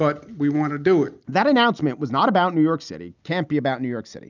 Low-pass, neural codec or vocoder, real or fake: 7.2 kHz; none; real